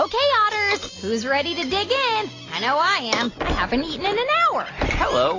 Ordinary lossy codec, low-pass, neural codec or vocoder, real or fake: AAC, 32 kbps; 7.2 kHz; none; real